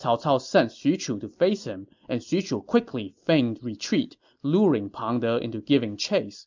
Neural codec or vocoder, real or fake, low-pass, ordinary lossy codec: none; real; 7.2 kHz; MP3, 64 kbps